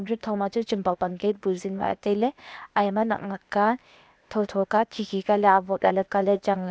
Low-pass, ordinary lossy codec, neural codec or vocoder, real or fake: none; none; codec, 16 kHz, 0.8 kbps, ZipCodec; fake